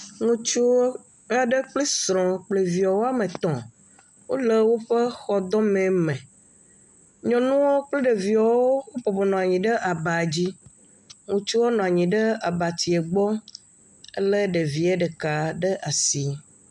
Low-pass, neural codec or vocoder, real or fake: 10.8 kHz; none; real